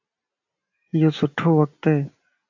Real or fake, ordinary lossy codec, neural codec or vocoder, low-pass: real; AAC, 48 kbps; none; 7.2 kHz